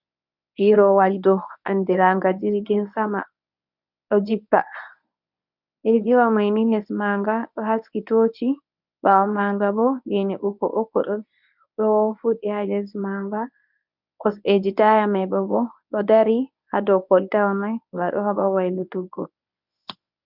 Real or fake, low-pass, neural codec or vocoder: fake; 5.4 kHz; codec, 24 kHz, 0.9 kbps, WavTokenizer, medium speech release version 1